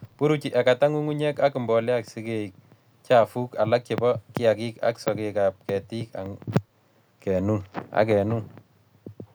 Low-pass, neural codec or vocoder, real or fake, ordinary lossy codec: none; none; real; none